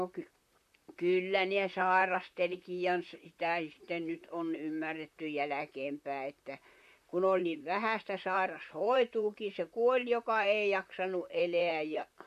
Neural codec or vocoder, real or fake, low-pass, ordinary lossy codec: vocoder, 44.1 kHz, 128 mel bands, Pupu-Vocoder; fake; 14.4 kHz; MP3, 64 kbps